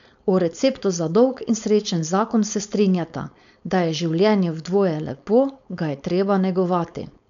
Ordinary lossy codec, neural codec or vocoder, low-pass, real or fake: none; codec, 16 kHz, 4.8 kbps, FACodec; 7.2 kHz; fake